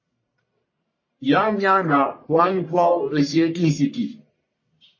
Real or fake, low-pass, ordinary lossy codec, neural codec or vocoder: fake; 7.2 kHz; MP3, 32 kbps; codec, 44.1 kHz, 1.7 kbps, Pupu-Codec